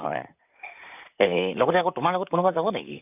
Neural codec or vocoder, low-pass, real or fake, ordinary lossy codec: vocoder, 44.1 kHz, 128 mel bands, Pupu-Vocoder; 3.6 kHz; fake; none